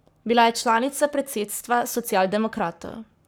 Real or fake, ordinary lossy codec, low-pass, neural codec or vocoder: fake; none; none; codec, 44.1 kHz, 7.8 kbps, Pupu-Codec